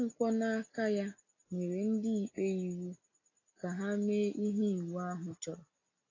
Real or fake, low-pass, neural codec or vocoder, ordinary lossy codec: real; 7.2 kHz; none; AAC, 32 kbps